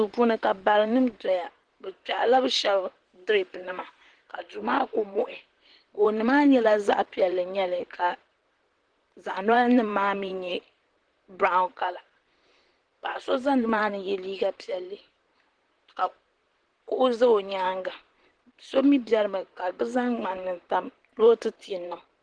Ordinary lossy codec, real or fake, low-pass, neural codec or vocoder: Opus, 16 kbps; fake; 9.9 kHz; vocoder, 44.1 kHz, 128 mel bands, Pupu-Vocoder